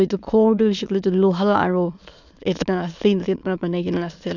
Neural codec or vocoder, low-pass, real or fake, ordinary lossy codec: autoencoder, 22.05 kHz, a latent of 192 numbers a frame, VITS, trained on many speakers; 7.2 kHz; fake; none